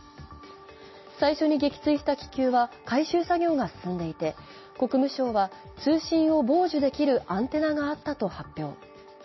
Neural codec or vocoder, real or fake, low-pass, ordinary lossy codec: none; real; 7.2 kHz; MP3, 24 kbps